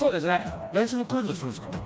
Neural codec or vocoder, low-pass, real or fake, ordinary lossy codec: codec, 16 kHz, 1 kbps, FreqCodec, smaller model; none; fake; none